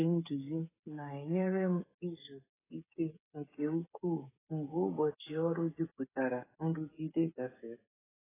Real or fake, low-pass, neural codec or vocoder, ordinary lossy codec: fake; 3.6 kHz; codec, 16 kHz, 8 kbps, FreqCodec, smaller model; AAC, 16 kbps